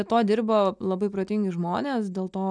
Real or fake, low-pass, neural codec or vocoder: real; 9.9 kHz; none